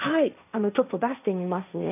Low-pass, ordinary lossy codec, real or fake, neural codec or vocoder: 3.6 kHz; none; fake; codec, 16 kHz, 1.1 kbps, Voila-Tokenizer